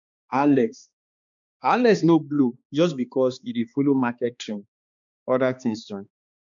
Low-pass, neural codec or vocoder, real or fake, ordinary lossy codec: 7.2 kHz; codec, 16 kHz, 2 kbps, X-Codec, HuBERT features, trained on balanced general audio; fake; MP3, 64 kbps